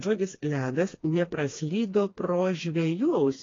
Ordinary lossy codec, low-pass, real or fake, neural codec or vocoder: AAC, 32 kbps; 7.2 kHz; fake; codec, 16 kHz, 2 kbps, FreqCodec, smaller model